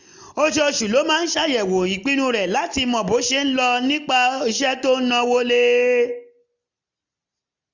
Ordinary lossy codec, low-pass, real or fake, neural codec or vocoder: none; 7.2 kHz; real; none